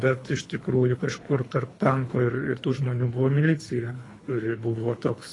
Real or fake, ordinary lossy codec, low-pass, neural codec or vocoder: fake; AAC, 32 kbps; 10.8 kHz; codec, 24 kHz, 3 kbps, HILCodec